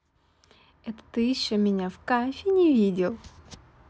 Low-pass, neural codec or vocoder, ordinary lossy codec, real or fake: none; none; none; real